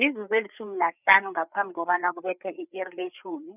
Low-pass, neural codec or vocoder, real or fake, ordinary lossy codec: 3.6 kHz; codec, 16 kHz, 4 kbps, FreqCodec, larger model; fake; none